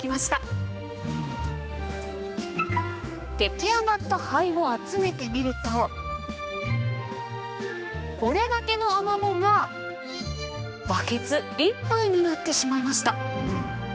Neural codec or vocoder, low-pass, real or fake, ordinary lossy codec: codec, 16 kHz, 2 kbps, X-Codec, HuBERT features, trained on general audio; none; fake; none